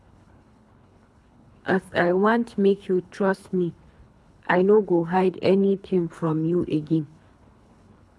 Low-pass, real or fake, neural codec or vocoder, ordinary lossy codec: none; fake; codec, 24 kHz, 3 kbps, HILCodec; none